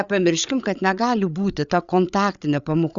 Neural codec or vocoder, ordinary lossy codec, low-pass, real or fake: codec, 16 kHz, 8 kbps, FreqCodec, larger model; Opus, 64 kbps; 7.2 kHz; fake